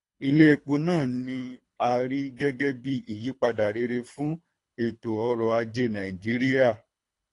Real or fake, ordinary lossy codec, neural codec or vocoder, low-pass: fake; AAC, 48 kbps; codec, 24 kHz, 3 kbps, HILCodec; 10.8 kHz